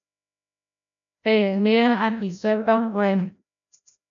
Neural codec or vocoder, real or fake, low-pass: codec, 16 kHz, 0.5 kbps, FreqCodec, larger model; fake; 7.2 kHz